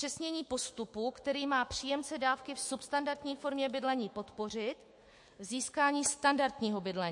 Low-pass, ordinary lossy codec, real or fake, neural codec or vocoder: 10.8 kHz; MP3, 48 kbps; fake; autoencoder, 48 kHz, 128 numbers a frame, DAC-VAE, trained on Japanese speech